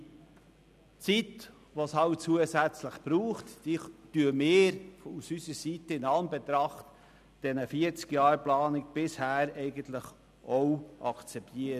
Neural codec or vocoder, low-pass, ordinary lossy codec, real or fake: none; 14.4 kHz; none; real